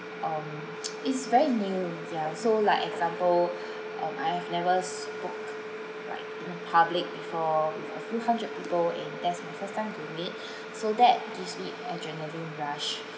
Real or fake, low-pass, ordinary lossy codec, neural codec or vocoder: real; none; none; none